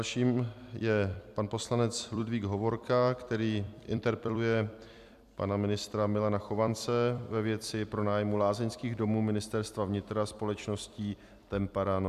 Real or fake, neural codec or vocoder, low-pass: fake; vocoder, 44.1 kHz, 128 mel bands every 256 samples, BigVGAN v2; 14.4 kHz